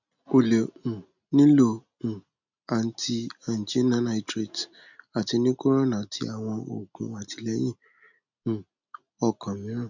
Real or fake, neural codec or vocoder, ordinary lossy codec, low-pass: real; none; none; 7.2 kHz